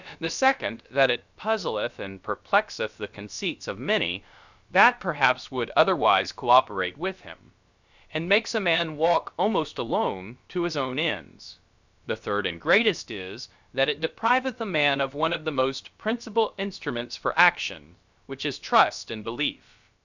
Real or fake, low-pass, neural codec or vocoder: fake; 7.2 kHz; codec, 16 kHz, about 1 kbps, DyCAST, with the encoder's durations